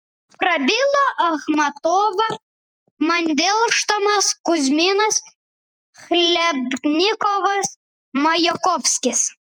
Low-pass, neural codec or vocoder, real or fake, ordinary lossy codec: 19.8 kHz; vocoder, 48 kHz, 128 mel bands, Vocos; fake; MP3, 96 kbps